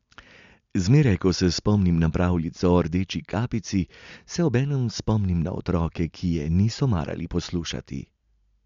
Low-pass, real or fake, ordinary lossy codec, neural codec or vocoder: 7.2 kHz; real; MP3, 64 kbps; none